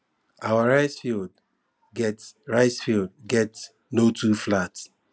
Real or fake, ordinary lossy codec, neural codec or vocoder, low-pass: real; none; none; none